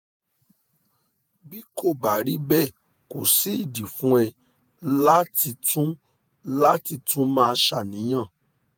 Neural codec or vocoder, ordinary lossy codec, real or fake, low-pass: vocoder, 48 kHz, 128 mel bands, Vocos; none; fake; none